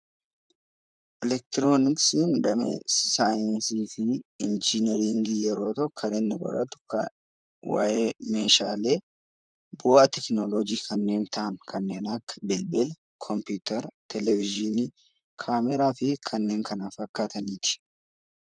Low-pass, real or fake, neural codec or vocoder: 9.9 kHz; fake; vocoder, 44.1 kHz, 128 mel bands, Pupu-Vocoder